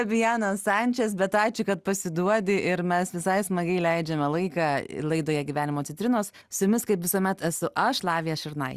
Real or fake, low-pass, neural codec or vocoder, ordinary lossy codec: real; 14.4 kHz; none; Opus, 64 kbps